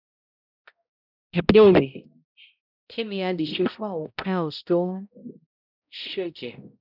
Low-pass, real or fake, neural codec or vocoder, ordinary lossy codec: 5.4 kHz; fake; codec, 16 kHz, 0.5 kbps, X-Codec, HuBERT features, trained on balanced general audio; none